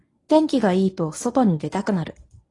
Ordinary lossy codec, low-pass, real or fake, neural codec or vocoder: AAC, 32 kbps; 10.8 kHz; fake; codec, 24 kHz, 0.9 kbps, WavTokenizer, medium speech release version 1